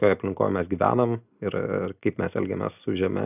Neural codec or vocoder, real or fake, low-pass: none; real; 3.6 kHz